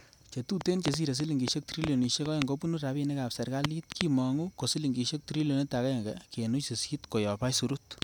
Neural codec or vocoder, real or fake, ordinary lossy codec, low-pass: none; real; none; 19.8 kHz